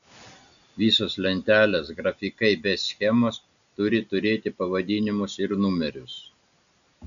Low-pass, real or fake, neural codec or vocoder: 7.2 kHz; real; none